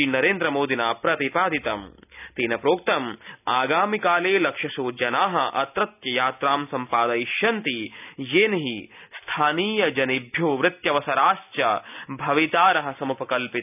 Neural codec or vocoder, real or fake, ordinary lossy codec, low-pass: none; real; none; 3.6 kHz